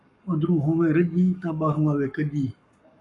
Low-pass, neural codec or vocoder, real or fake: 10.8 kHz; codec, 44.1 kHz, 7.8 kbps, Pupu-Codec; fake